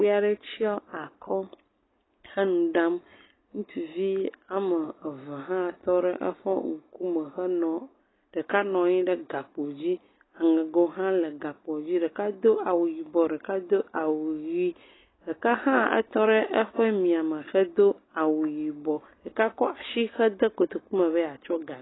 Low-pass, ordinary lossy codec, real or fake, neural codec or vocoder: 7.2 kHz; AAC, 16 kbps; real; none